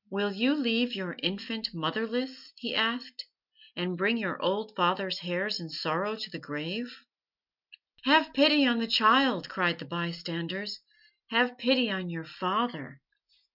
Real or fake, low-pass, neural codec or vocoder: real; 5.4 kHz; none